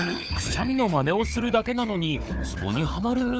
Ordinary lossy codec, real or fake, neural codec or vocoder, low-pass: none; fake; codec, 16 kHz, 4 kbps, FunCodec, trained on Chinese and English, 50 frames a second; none